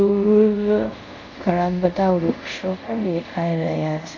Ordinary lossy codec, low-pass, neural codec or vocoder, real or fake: none; 7.2 kHz; codec, 24 kHz, 0.5 kbps, DualCodec; fake